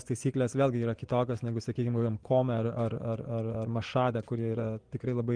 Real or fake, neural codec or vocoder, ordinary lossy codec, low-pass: fake; vocoder, 24 kHz, 100 mel bands, Vocos; Opus, 24 kbps; 9.9 kHz